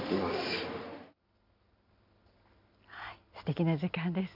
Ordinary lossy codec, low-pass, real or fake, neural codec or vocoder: none; 5.4 kHz; real; none